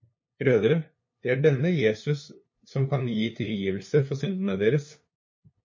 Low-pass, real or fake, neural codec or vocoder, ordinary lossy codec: 7.2 kHz; fake; codec, 16 kHz, 2 kbps, FunCodec, trained on LibriTTS, 25 frames a second; MP3, 32 kbps